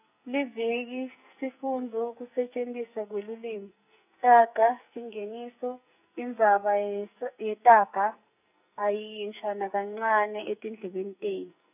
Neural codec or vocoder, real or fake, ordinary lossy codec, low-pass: codec, 44.1 kHz, 2.6 kbps, SNAC; fake; none; 3.6 kHz